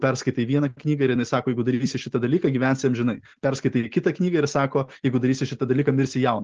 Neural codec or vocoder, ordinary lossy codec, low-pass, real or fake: none; Opus, 16 kbps; 7.2 kHz; real